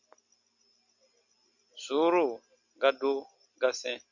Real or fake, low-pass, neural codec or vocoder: real; 7.2 kHz; none